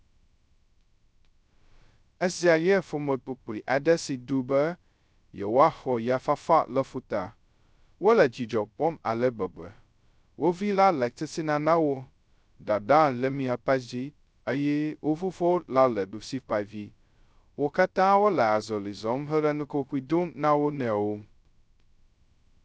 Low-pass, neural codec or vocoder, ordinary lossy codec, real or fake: none; codec, 16 kHz, 0.2 kbps, FocalCodec; none; fake